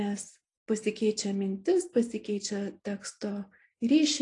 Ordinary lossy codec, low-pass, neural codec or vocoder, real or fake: AAC, 48 kbps; 10.8 kHz; none; real